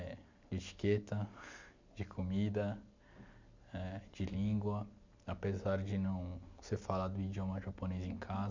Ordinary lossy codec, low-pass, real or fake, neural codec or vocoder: MP3, 64 kbps; 7.2 kHz; real; none